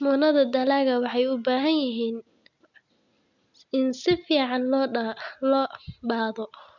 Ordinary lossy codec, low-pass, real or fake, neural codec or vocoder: none; 7.2 kHz; real; none